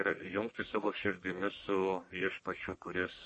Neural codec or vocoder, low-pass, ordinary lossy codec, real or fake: codec, 44.1 kHz, 1.7 kbps, Pupu-Codec; 10.8 kHz; MP3, 32 kbps; fake